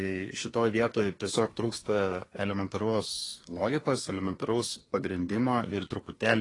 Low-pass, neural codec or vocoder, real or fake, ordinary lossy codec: 10.8 kHz; codec, 24 kHz, 1 kbps, SNAC; fake; AAC, 32 kbps